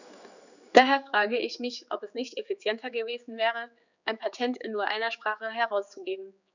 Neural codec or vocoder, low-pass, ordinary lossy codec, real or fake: codec, 16 kHz, 4 kbps, X-Codec, HuBERT features, trained on general audio; 7.2 kHz; none; fake